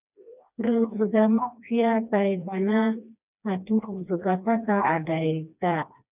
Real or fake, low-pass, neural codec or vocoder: fake; 3.6 kHz; codec, 16 kHz, 2 kbps, FreqCodec, smaller model